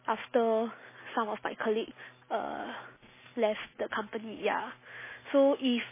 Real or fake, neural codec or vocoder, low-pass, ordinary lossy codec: real; none; 3.6 kHz; MP3, 16 kbps